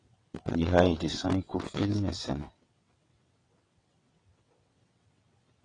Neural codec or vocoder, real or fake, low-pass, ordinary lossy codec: vocoder, 22.05 kHz, 80 mel bands, WaveNeXt; fake; 9.9 kHz; AAC, 32 kbps